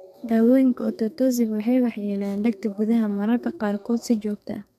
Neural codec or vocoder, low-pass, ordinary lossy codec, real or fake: codec, 32 kHz, 1.9 kbps, SNAC; 14.4 kHz; none; fake